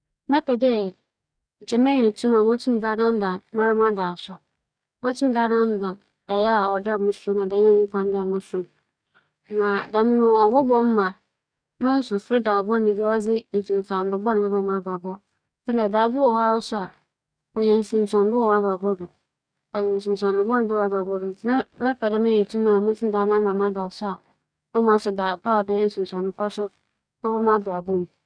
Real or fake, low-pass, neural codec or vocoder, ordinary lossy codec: fake; 9.9 kHz; codec, 44.1 kHz, 2.6 kbps, DAC; none